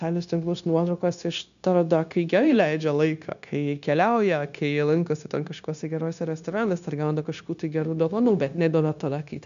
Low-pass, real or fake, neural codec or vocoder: 7.2 kHz; fake; codec, 16 kHz, 0.9 kbps, LongCat-Audio-Codec